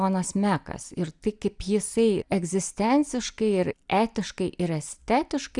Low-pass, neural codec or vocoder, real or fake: 10.8 kHz; none; real